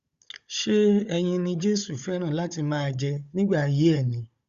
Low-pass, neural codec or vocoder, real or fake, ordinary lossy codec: 7.2 kHz; codec, 16 kHz, 16 kbps, FunCodec, trained on Chinese and English, 50 frames a second; fake; Opus, 64 kbps